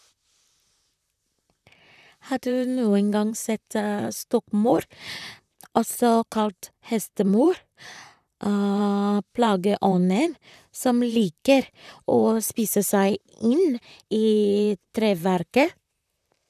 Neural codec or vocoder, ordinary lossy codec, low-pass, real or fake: vocoder, 44.1 kHz, 128 mel bands, Pupu-Vocoder; none; 14.4 kHz; fake